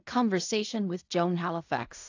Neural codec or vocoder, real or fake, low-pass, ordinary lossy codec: codec, 16 kHz in and 24 kHz out, 0.4 kbps, LongCat-Audio-Codec, fine tuned four codebook decoder; fake; 7.2 kHz; AAC, 48 kbps